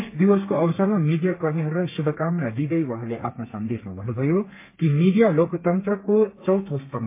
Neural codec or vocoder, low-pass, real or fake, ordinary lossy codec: codec, 32 kHz, 1.9 kbps, SNAC; 3.6 kHz; fake; MP3, 24 kbps